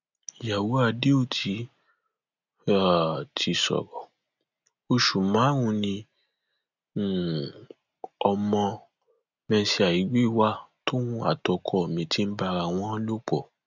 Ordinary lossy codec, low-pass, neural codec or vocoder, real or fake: none; 7.2 kHz; none; real